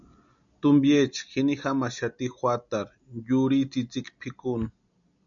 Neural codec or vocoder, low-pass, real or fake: none; 7.2 kHz; real